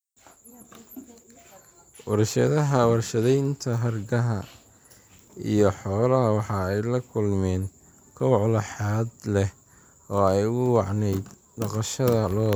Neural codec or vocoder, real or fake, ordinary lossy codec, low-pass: vocoder, 44.1 kHz, 128 mel bands every 256 samples, BigVGAN v2; fake; none; none